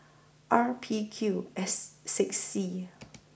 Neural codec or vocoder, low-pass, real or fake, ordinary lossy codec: none; none; real; none